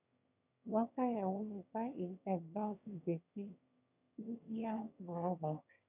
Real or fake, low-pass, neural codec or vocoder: fake; 3.6 kHz; autoencoder, 22.05 kHz, a latent of 192 numbers a frame, VITS, trained on one speaker